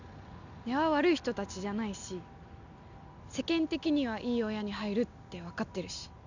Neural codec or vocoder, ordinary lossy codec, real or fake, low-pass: none; none; real; 7.2 kHz